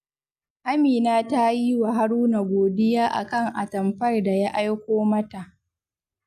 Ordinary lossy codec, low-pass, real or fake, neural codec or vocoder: none; 14.4 kHz; real; none